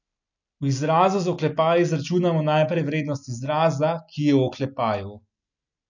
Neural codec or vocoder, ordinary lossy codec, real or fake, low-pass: none; none; real; 7.2 kHz